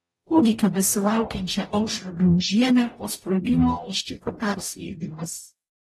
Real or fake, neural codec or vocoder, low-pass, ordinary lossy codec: fake; codec, 44.1 kHz, 0.9 kbps, DAC; 19.8 kHz; AAC, 32 kbps